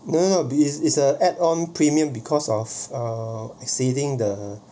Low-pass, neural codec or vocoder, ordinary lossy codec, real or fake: none; none; none; real